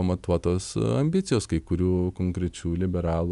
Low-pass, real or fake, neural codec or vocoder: 10.8 kHz; real; none